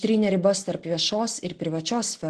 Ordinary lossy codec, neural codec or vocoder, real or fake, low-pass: Opus, 16 kbps; none; real; 9.9 kHz